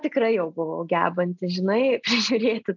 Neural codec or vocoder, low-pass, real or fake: none; 7.2 kHz; real